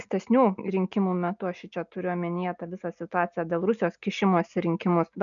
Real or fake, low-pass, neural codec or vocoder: real; 7.2 kHz; none